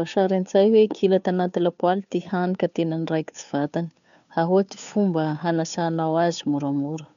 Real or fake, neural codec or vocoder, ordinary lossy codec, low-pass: fake; codec, 16 kHz, 2 kbps, FunCodec, trained on Chinese and English, 25 frames a second; none; 7.2 kHz